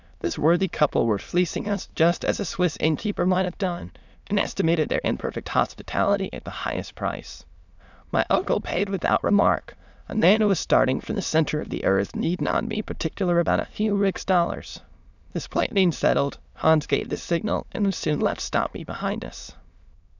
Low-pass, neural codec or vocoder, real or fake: 7.2 kHz; autoencoder, 22.05 kHz, a latent of 192 numbers a frame, VITS, trained on many speakers; fake